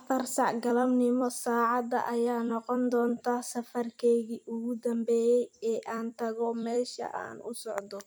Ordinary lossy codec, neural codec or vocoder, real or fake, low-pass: none; vocoder, 44.1 kHz, 128 mel bands every 256 samples, BigVGAN v2; fake; none